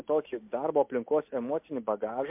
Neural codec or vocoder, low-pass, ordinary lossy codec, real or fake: none; 3.6 kHz; MP3, 32 kbps; real